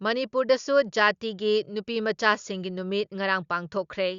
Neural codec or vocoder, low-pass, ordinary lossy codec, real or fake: none; 7.2 kHz; Opus, 64 kbps; real